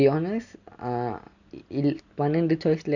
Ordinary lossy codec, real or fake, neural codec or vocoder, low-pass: none; real; none; 7.2 kHz